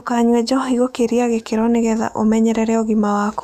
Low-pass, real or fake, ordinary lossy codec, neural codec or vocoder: 14.4 kHz; fake; none; autoencoder, 48 kHz, 128 numbers a frame, DAC-VAE, trained on Japanese speech